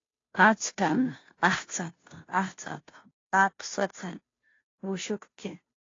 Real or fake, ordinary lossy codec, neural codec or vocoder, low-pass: fake; AAC, 32 kbps; codec, 16 kHz, 0.5 kbps, FunCodec, trained on Chinese and English, 25 frames a second; 7.2 kHz